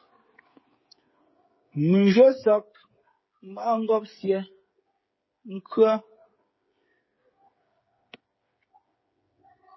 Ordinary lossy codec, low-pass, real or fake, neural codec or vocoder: MP3, 24 kbps; 7.2 kHz; fake; codec, 16 kHz, 8 kbps, FreqCodec, smaller model